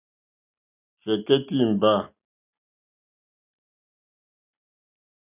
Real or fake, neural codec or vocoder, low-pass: real; none; 3.6 kHz